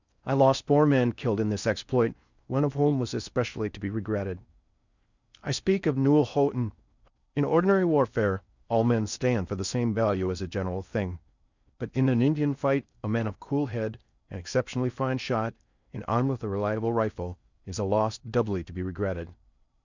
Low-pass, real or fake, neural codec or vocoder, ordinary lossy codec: 7.2 kHz; fake; codec, 16 kHz in and 24 kHz out, 0.6 kbps, FocalCodec, streaming, 4096 codes; Opus, 64 kbps